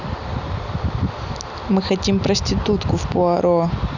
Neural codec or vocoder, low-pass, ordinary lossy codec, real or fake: none; 7.2 kHz; none; real